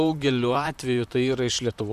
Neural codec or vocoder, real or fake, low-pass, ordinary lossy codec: vocoder, 44.1 kHz, 128 mel bands, Pupu-Vocoder; fake; 14.4 kHz; MP3, 96 kbps